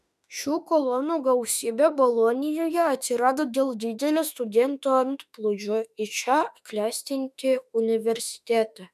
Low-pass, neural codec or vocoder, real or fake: 14.4 kHz; autoencoder, 48 kHz, 32 numbers a frame, DAC-VAE, trained on Japanese speech; fake